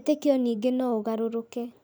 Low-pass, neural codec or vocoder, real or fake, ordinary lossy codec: none; vocoder, 44.1 kHz, 128 mel bands every 512 samples, BigVGAN v2; fake; none